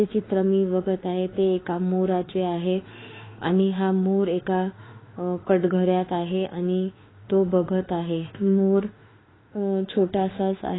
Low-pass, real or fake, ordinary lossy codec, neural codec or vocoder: 7.2 kHz; fake; AAC, 16 kbps; autoencoder, 48 kHz, 32 numbers a frame, DAC-VAE, trained on Japanese speech